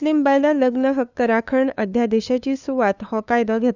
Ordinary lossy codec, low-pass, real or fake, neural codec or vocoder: none; 7.2 kHz; fake; codec, 16 kHz, 2 kbps, FunCodec, trained on LibriTTS, 25 frames a second